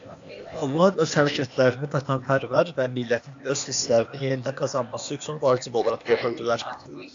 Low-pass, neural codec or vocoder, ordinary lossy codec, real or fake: 7.2 kHz; codec, 16 kHz, 0.8 kbps, ZipCodec; AAC, 64 kbps; fake